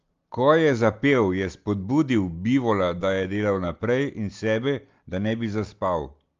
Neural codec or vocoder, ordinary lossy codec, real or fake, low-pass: none; Opus, 32 kbps; real; 7.2 kHz